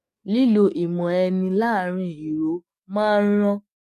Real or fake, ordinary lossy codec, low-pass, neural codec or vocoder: fake; MP3, 64 kbps; 14.4 kHz; codec, 44.1 kHz, 7.8 kbps, DAC